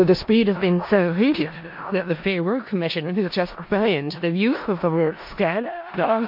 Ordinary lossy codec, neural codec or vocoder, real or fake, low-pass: MP3, 32 kbps; codec, 16 kHz in and 24 kHz out, 0.4 kbps, LongCat-Audio-Codec, four codebook decoder; fake; 5.4 kHz